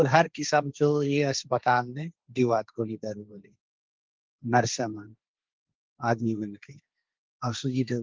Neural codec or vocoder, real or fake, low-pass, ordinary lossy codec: codec, 16 kHz, 1.1 kbps, Voila-Tokenizer; fake; 7.2 kHz; Opus, 32 kbps